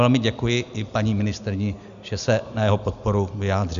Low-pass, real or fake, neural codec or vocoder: 7.2 kHz; real; none